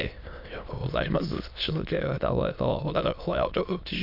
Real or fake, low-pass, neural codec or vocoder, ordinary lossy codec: fake; 5.4 kHz; autoencoder, 22.05 kHz, a latent of 192 numbers a frame, VITS, trained on many speakers; none